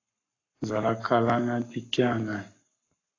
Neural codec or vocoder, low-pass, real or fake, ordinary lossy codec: codec, 44.1 kHz, 7.8 kbps, Pupu-Codec; 7.2 kHz; fake; AAC, 32 kbps